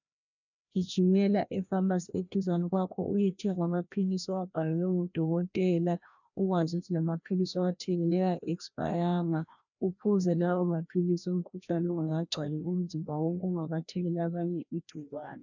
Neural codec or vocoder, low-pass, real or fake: codec, 16 kHz, 1 kbps, FreqCodec, larger model; 7.2 kHz; fake